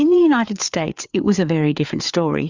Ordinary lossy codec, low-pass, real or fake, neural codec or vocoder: Opus, 64 kbps; 7.2 kHz; fake; codec, 16 kHz, 4 kbps, FreqCodec, larger model